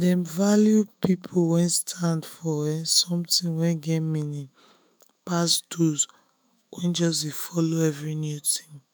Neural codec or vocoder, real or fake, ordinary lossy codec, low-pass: autoencoder, 48 kHz, 128 numbers a frame, DAC-VAE, trained on Japanese speech; fake; none; none